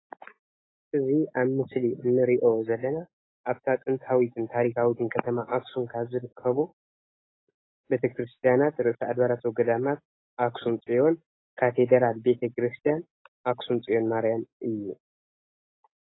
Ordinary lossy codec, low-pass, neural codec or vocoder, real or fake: AAC, 16 kbps; 7.2 kHz; none; real